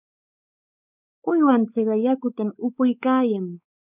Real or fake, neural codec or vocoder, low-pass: fake; codec, 16 kHz, 4 kbps, X-Codec, WavLM features, trained on Multilingual LibriSpeech; 3.6 kHz